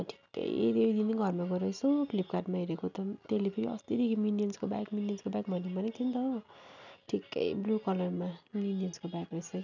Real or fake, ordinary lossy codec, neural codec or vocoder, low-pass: real; none; none; 7.2 kHz